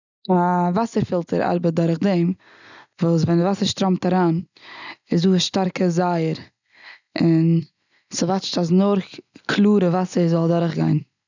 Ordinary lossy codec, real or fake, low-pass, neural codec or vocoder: none; real; 7.2 kHz; none